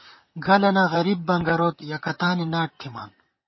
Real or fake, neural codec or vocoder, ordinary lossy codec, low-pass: fake; vocoder, 44.1 kHz, 128 mel bands, Pupu-Vocoder; MP3, 24 kbps; 7.2 kHz